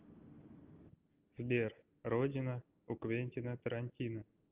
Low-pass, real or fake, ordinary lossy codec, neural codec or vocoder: 3.6 kHz; real; Opus, 24 kbps; none